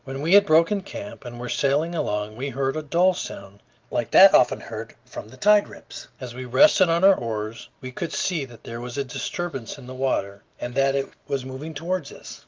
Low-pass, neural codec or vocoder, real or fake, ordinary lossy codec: 7.2 kHz; none; real; Opus, 32 kbps